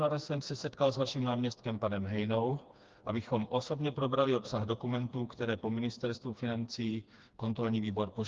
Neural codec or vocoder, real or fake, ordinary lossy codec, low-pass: codec, 16 kHz, 2 kbps, FreqCodec, smaller model; fake; Opus, 32 kbps; 7.2 kHz